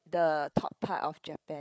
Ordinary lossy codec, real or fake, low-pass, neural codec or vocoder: none; fake; none; codec, 16 kHz, 8 kbps, FreqCodec, larger model